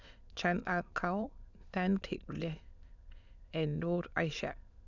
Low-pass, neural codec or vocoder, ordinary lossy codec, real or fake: 7.2 kHz; autoencoder, 22.05 kHz, a latent of 192 numbers a frame, VITS, trained on many speakers; none; fake